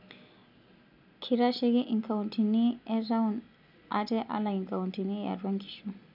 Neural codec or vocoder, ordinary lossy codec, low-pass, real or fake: none; none; 5.4 kHz; real